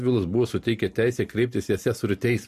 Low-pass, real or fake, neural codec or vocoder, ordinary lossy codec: 14.4 kHz; real; none; MP3, 64 kbps